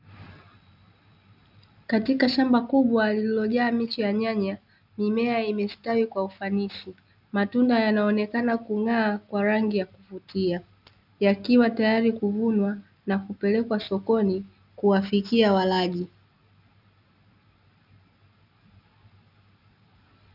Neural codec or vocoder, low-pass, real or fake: none; 5.4 kHz; real